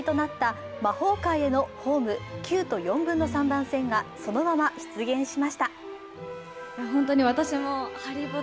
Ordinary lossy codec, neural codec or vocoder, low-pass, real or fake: none; none; none; real